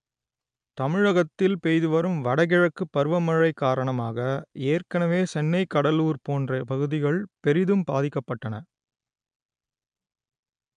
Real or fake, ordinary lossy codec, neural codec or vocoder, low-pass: real; AAC, 96 kbps; none; 9.9 kHz